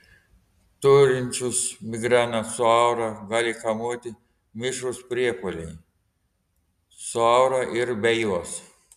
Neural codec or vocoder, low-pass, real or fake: none; 14.4 kHz; real